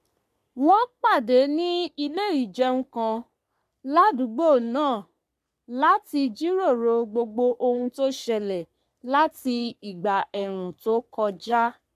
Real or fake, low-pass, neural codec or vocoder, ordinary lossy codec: fake; 14.4 kHz; codec, 44.1 kHz, 3.4 kbps, Pupu-Codec; MP3, 96 kbps